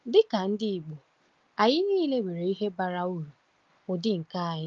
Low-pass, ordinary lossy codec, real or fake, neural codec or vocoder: 7.2 kHz; Opus, 32 kbps; real; none